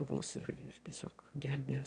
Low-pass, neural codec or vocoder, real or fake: 9.9 kHz; autoencoder, 22.05 kHz, a latent of 192 numbers a frame, VITS, trained on one speaker; fake